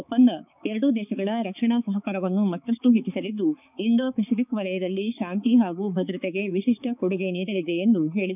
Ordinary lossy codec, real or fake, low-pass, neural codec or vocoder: none; fake; 3.6 kHz; codec, 16 kHz, 4 kbps, X-Codec, HuBERT features, trained on balanced general audio